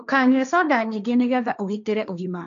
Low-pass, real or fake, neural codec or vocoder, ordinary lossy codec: 7.2 kHz; fake; codec, 16 kHz, 1.1 kbps, Voila-Tokenizer; none